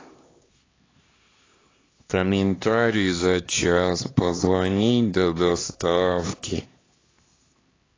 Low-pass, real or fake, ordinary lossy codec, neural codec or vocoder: 7.2 kHz; fake; AAC, 32 kbps; codec, 16 kHz, 2 kbps, X-Codec, HuBERT features, trained on LibriSpeech